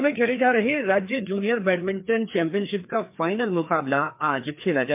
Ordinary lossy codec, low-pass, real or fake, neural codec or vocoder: MP3, 32 kbps; 3.6 kHz; fake; codec, 16 kHz, 2 kbps, FreqCodec, larger model